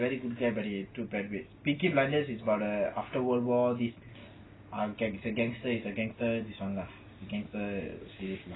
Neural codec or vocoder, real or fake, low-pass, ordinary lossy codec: none; real; 7.2 kHz; AAC, 16 kbps